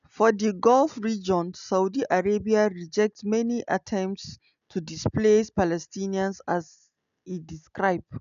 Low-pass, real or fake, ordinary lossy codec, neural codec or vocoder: 7.2 kHz; real; none; none